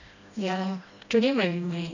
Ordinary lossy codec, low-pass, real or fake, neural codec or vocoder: none; 7.2 kHz; fake; codec, 16 kHz, 1 kbps, FreqCodec, smaller model